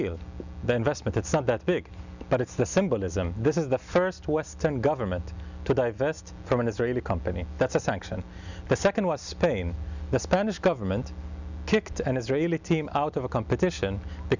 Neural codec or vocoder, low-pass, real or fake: none; 7.2 kHz; real